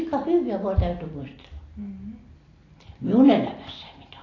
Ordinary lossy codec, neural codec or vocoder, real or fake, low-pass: AAC, 32 kbps; none; real; 7.2 kHz